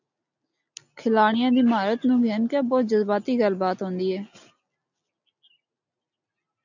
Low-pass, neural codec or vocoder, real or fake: 7.2 kHz; none; real